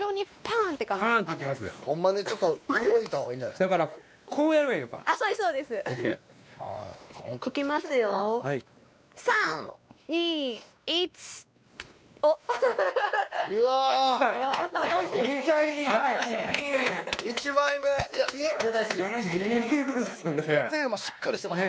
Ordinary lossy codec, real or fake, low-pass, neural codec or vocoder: none; fake; none; codec, 16 kHz, 2 kbps, X-Codec, WavLM features, trained on Multilingual LibriSpeech